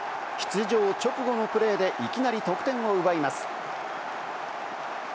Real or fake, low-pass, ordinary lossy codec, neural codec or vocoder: real; none; none; none